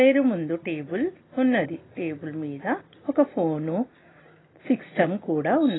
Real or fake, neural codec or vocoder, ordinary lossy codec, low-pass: real; none; AAC, 16 kbps; 7.2 kHz